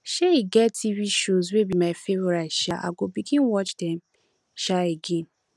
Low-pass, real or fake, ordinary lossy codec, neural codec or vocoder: none; real; none; none